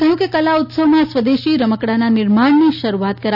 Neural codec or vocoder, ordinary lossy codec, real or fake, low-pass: none; none; real; 5.4 kHz